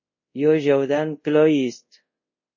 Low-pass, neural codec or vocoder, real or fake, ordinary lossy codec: 7.2 kHz; codec, 24 kHz, 0.5 kbps, DualCodec; fake; MP3, 32 kbps